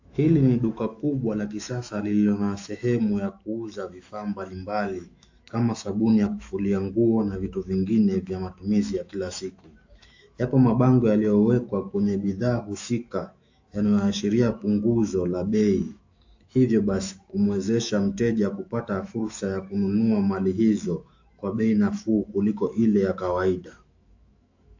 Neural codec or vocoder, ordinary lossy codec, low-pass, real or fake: autoencoder, 48 kHz, 128 numbers a frame, DAC-VAE, trained on Japanese speech; AAC, 48 kbps; 7.2 kHz; fake